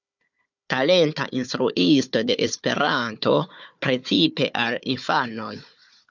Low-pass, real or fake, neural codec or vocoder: 7.2 kHz; fake; codec, 16 kHz, 4 kbps, FunCodec, trained on Chinese and English, 50 frames a second